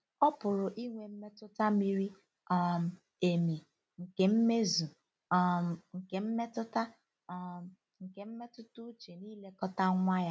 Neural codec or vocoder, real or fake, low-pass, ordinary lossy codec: none; real; none; none